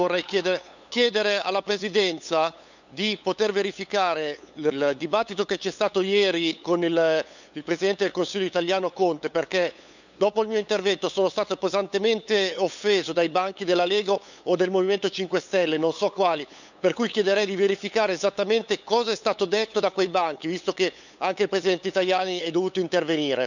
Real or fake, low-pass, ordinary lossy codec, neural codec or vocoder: fake; 7.2 kHz; none; codec, 16 kHz, 8 kbps, FunCodec, trained on LibriTTS, 25 frames a second